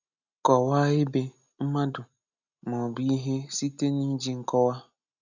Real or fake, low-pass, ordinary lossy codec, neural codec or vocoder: real; 7.2 kHz; none; none